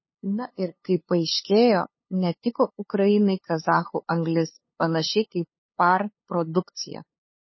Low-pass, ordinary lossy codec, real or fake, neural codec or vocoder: 7.2 kHz; MP3, 24 kbps; fake; codec, 16 kHz, 2 kbps, FunCodec, trained on LibriTTS, 25 frames a second